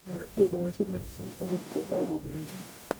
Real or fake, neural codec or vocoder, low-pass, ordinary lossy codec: fake; codec, 44.1 kHz, 0.9 kbps, DAC; none; none